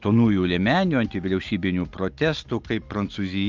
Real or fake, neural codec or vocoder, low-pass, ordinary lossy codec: real; none; 7.2 kHz; Opus, 32 kbps